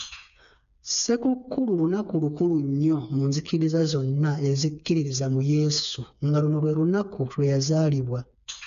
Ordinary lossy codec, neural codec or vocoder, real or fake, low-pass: none; codec, 16 kHz, 4 kbps, FreqCodec, smaller model; fake; 7.2 kHz